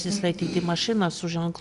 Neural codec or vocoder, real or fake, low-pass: none; real; 10.8 kHz